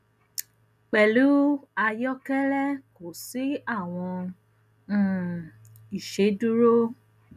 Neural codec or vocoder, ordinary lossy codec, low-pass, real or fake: none; none; 14.4 kHz; real